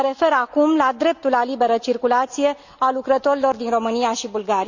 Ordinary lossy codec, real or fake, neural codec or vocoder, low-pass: none; real; none; 7.2 kHz